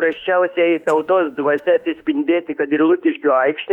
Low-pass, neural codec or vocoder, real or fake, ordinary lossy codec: 19.8 kHz; autoencoder, 48 kHz, 32 numbers a frame, DAC-VAE, trained on Japanese speech; fake; Opus, 64 kbps